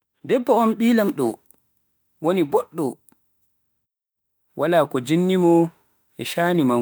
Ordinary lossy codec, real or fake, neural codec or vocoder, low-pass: none; fake; autoencoder, 48 kHz, 32 numbers a frame, DAC-VAE, trained on Japanese speech; none